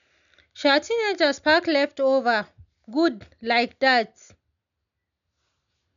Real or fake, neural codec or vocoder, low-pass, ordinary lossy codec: real; none; 7.2 kHz; none